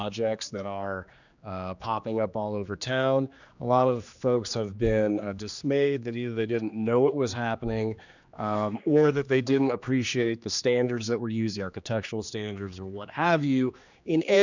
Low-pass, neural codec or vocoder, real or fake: 7.2 kHz; codec, 16 kHz, 2 kbps, X-Codec, HuBERT features, trained on general audio; fake